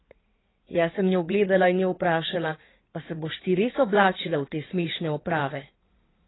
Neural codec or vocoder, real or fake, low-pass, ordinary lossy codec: codec, 16 kHz in and 24 kHz out, 2.2 kbps, FireRedTTS-2 codec; fake; 7.2 kHz; AAC, 16 kbps